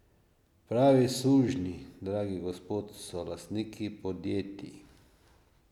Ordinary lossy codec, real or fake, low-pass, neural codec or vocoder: none; real; 19.8 kHz; none